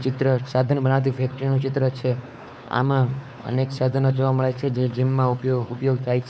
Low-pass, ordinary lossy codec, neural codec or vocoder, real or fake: none; none; codec, 16 kHz, 4 kbps, X-Codec, WavLM features, trained on Multilingual LibriSpeech; fake